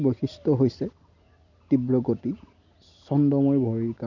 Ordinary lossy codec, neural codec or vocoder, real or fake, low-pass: none; none; real; 7.2 kHz